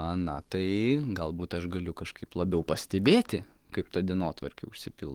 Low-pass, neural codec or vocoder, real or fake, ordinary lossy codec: 19.8 kHz; codec, 44.1 kHz, 7.8 kbps, DAC; fake; Opus, 24 kbps